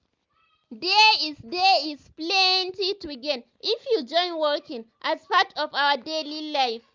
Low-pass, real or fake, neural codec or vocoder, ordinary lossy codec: 7.2 kHz; real; none; Opus, 24 kbps